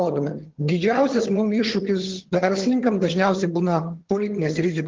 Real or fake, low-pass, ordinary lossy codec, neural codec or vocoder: fake; 7.2 kHz; Opus, 16 kbps; vocoder, 22.05 kHz, 80 mel bands, HiFi-GAN